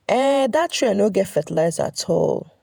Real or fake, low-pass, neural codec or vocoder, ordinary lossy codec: fake; none; vocoder, 48 kHz, 128 mel bands, Vocos; none